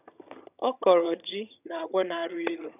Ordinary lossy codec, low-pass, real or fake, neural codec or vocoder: none; 3.6 kHz; fake; vocoder, 22.05 kHz, 80 mel bands, HiFi-GAN